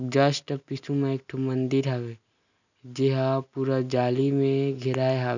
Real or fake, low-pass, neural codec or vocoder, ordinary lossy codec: real; 7.2 kHz; none; none